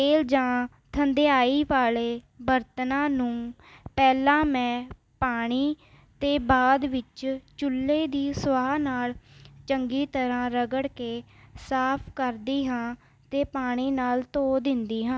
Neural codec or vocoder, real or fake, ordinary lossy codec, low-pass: none; real; none; none